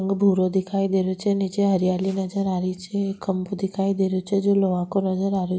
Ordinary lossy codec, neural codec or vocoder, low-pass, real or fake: none; none; none; real